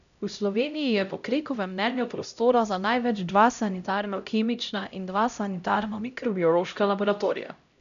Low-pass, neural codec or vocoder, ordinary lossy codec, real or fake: 7.2 kHz; codec, 16 kHz, 0.5 kbps, X-Codec, HuBERT features, trained on LibriSpeech; none; fake